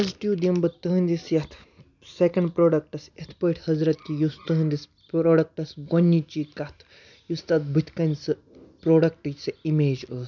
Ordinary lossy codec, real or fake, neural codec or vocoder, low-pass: none; real; none; 7.2 kHz